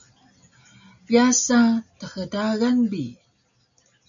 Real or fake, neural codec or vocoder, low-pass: real; none; 7.2 kHz